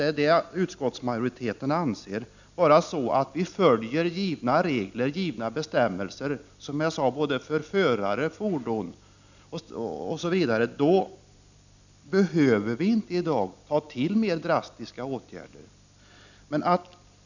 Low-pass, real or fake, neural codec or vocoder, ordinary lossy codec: 7.2 kHz; real; none; none